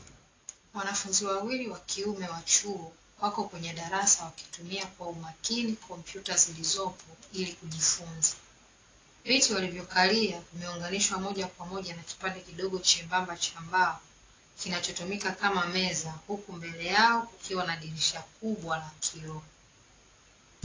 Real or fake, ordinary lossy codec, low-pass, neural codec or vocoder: real; AAC, 32 kbps; 7.2 kHz; none